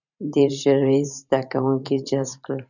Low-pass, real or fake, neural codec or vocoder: 7.2 kHz; fake; vocoder, 44.1 kHz, 128 mel bands every 512 samples, BigVGAN v2